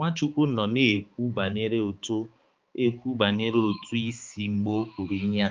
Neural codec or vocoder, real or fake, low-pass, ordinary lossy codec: codec, 16 kHz, 4 kbps, X-Codec, HuBERT features, trained on balanced general audio; fake; 7.2 kHz; Opus, 24 kbps